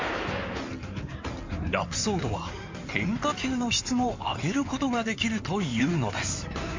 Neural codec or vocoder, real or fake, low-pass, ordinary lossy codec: codec, 16 kHz in and 24 kHz out, 2.2 kbps, FireRedTTS-2 codec; fake; 7.2 kHz; MP3, 64 kbps